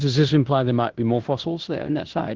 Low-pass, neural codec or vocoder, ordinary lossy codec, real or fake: 7.2 kHz; codec, 16 kHz in and 24 kHz out, 0.9 kbps, LongCat-Audio-Codec, fine tuned four codebook decoder; Opus, 16 kbps; fake